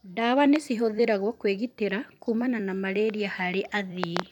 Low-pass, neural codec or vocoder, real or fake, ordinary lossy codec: 19.8 kHz; vocoder, 44.1 kHz, 128 mel bands every 256 samples, BigVGAN v2; fake; none